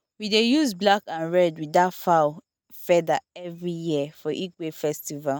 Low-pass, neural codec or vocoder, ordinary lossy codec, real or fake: none; none; none; real